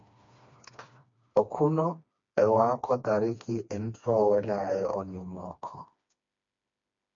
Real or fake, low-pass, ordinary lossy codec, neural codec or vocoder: fake; 7.2 kHz; MP3, 48 kbps; codec, 16 kHz, 2 kbps, FreqCodec, smaller model